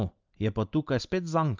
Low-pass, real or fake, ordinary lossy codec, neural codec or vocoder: 7.2 kHz; real; Opus, 24 kbps; none